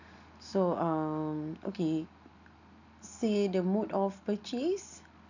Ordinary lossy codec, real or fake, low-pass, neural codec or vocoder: none; real; 7.2 kHz; none